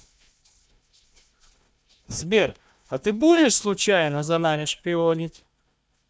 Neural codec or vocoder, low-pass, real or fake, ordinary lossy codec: codec, 16 kHz, 1 kbps, FunCodec, trained on Chinese and English, 50 frames a second; none; fake; none